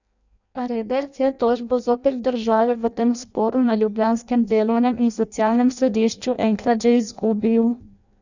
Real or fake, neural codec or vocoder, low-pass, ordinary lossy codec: fake; codec, 16 kHz in and 24 kHz out, 0.6 kbps, FireRedTTS-2 codec; 7.2 kHz; none